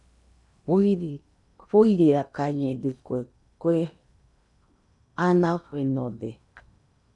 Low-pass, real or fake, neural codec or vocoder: 10.8 kHz; fake; codec, 16 kHz in and 24 kHz out, 0.8 kbps, FocalCodec, streaming, 65536 codes